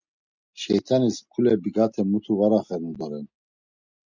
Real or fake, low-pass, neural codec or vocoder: real; 7.2 kHz; none